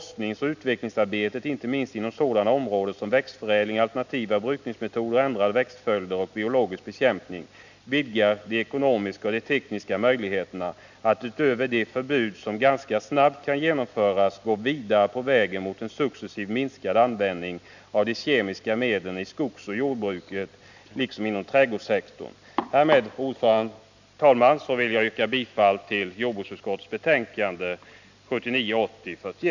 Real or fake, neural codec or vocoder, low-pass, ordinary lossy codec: real; none; 7.2 kHz; none